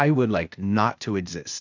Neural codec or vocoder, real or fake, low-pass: codec, 16 kHz, 0.8 kbps, ZipCodec; fake; 7.2 kHz